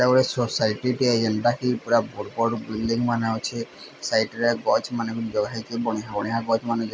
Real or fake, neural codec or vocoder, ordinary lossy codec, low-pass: real; none; none; none